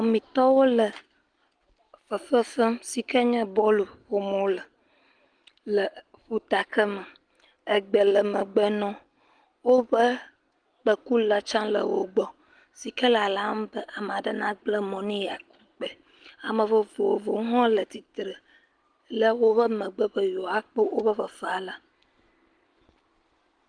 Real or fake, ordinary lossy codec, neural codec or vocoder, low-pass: real; Opus, 24 kbps; none; 9.9 kHz